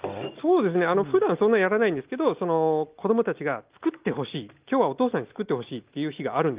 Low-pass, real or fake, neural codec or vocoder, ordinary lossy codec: 3.6 kHz; real; none; Opus, 32 kbps